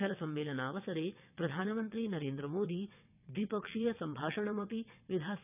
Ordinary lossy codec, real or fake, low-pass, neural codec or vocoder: none; fake; 3.6 kHz; vocoder, 22.05 kHz, 80 mel bands, WaveNeXt